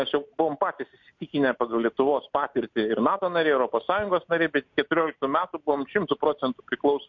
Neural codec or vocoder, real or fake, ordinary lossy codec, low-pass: none; real; MP3, 48 kbps; 7.2 kHz